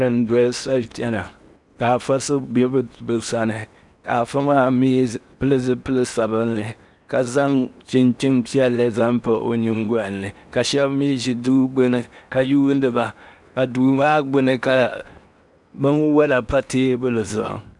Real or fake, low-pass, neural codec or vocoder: fake; 10.8 kHz; codec, 16 kHz in and 24 kHz out, 0.8 kbps, FocalCodec, streaming, 65536 codes